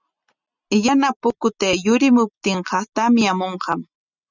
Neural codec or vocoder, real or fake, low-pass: none; real; 7.2 kHz